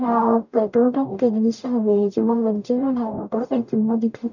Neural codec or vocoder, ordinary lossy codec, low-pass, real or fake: codec, 44.1 kHz, 0.9 kbps, DAC; none; 7.2 kHz; fake